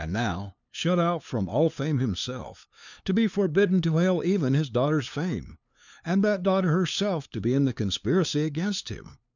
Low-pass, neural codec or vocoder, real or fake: 7.2 kHz; codec, 16 kHz, 2 kbps, FunCodec, trained on LibriTTS, 25 frames a second; fake